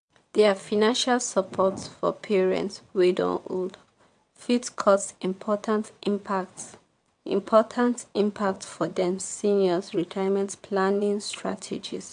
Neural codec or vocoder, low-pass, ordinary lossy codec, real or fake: vocoder, 22.05 kHz, 80 mel bands, WaveNeXt; 9.9 kHz; MP3, 64 kbps; fake